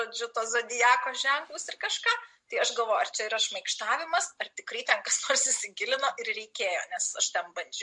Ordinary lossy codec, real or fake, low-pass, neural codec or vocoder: MP3, 48 kbps; real; 10.8 kHz; none